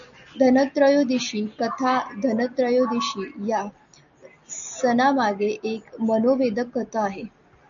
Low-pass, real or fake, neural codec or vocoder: 7.2 kHz; real; none